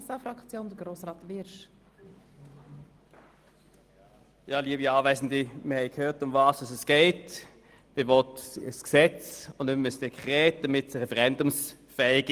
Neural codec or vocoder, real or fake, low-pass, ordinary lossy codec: none; real; 14.4 kHz; Opus, 24 kbps